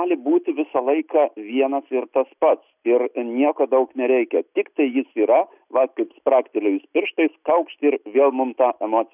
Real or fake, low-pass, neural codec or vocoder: real; 3.6 kHz; none